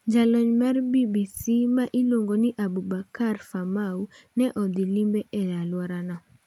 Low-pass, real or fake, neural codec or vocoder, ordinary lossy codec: 19.8 kHz; real; none; none